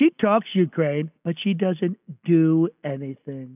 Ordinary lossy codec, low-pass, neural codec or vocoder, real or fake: AAC, 32 kbps; 3.6 kHz; none; real